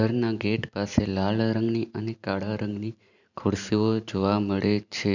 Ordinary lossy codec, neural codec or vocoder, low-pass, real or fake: none; vocoder, 44.1 kHz, 128 mel bands every 512 samples, BigVGAN v2; 7.2 kHz; fake